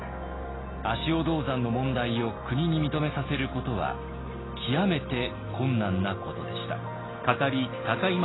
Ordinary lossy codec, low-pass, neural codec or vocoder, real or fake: AAC, 16 kbps; 7.2 kHz; none; real